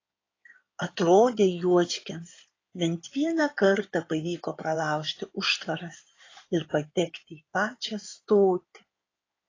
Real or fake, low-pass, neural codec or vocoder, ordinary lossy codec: fake; 7.2 kHz; codec, 16 kHz in and 24 kHz out, 2.2 kbps, FireRedTTS-2 codec; AAC, 32 kbps